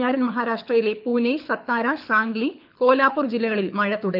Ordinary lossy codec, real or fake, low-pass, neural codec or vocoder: none; fake; 5.4 kHz; codec, 24 kHz, 6 kbps, HILCodec